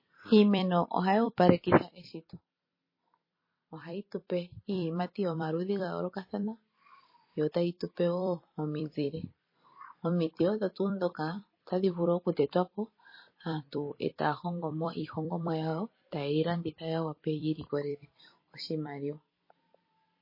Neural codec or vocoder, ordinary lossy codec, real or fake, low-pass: vocoder, 44.1 kHz, 128 mel bands every 256 samples, BigVGAN v2; MP3, 24 kbps; fake; 5.4 kHz